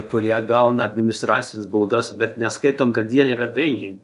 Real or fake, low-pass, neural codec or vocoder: fake; 10.8 kHz; codec, 16 kHz in and 24 kHz out, 0.8 kbps, FocalCodec, streaming, 65536 codes